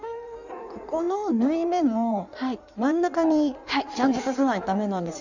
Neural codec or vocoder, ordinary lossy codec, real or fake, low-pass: codec, 16 kHz in and 24 kHz out, 1.1 kbps, FireRedTTS-2 codec; none; fake; 7.2 kHz